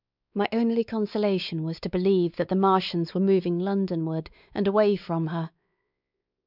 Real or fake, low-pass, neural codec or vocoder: fake; 5.4 kHz; codec, 16 kHz, 4 kbps, X-Codec, WavLM features, trained on Multilingual LibriSpeech